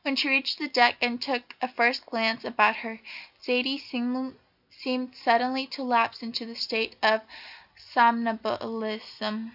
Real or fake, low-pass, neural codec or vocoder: real; 5.4 kHz; none